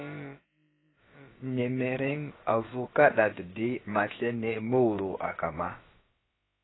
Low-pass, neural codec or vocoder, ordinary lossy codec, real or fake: 7.2 kHz; codec, 16 kHz, about 1 kbps, DyCAST, with the encoder's durations; AAC, 16 kbps; fake